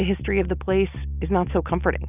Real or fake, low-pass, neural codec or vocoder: real; 3.6 kHz; none